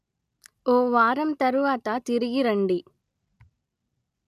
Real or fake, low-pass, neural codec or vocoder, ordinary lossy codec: real; 14.4 kHz; none; none